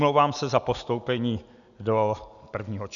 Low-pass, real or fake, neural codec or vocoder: 7.2 kHz; real; none